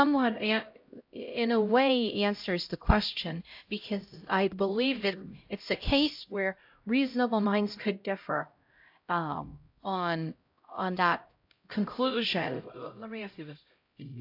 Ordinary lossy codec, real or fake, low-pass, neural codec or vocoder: AAC, 48 kbps; fake; 5.4 kHz; codec, 16 kHz, 0.5 kbps, X-Codec, HuBERT features, trained on LibriSpeech